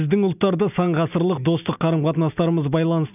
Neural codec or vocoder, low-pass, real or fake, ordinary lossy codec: none; 3.6 kHz; real; none